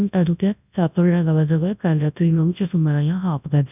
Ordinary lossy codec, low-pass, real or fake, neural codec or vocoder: none; 3.6 kHz; fake; codec, 24 kHz, 0.9 kbps, WavTokenizer, large speech release